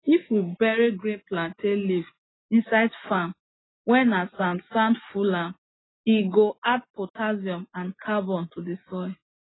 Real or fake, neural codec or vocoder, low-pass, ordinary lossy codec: real; none; 7.2 kHz; AAC, 16 kbps